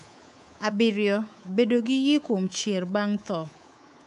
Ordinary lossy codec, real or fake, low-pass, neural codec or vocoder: none; fake; 10.8 kHz; codec, 24 kHz, 3.1 kbps, DualCodec